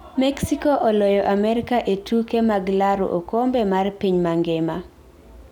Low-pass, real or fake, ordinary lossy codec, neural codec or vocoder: 19.8 kHz; real; none; none